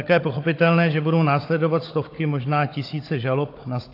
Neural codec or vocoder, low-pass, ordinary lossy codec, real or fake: codec, 16 kHz, 16 kbps, FunCodec, trained on Chinese and English, 50 frames a second; 5.4 kHz; AAC, 32 kbps; fake